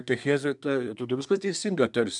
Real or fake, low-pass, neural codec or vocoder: fake; 10.8 kHz; codec, 24 kHz, 1 kbps, SNAC